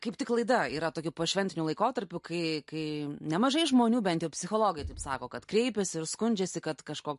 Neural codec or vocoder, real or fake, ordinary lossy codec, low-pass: none; real; MP3, 48 kbps; 14.4 kHz